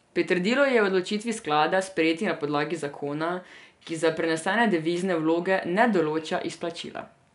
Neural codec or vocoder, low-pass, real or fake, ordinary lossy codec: none; 10.8 kHz; real; none